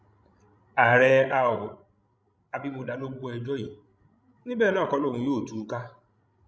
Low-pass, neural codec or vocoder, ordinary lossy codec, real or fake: none; codec, 16 kHz, 16 kbps, FreqCodec, larger model; none; fake